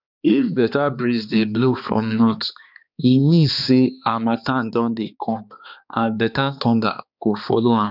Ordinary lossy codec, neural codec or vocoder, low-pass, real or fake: none; codec, 16 kHz, 2 kbps, X-Codec, HuBERT features, trained on balanced general audio; 5.4 kHz; fake